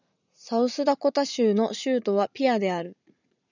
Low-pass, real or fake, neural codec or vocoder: 7.2 kHz; fake; vocoder, 44.1 kHz, 80 mel bands, Vocos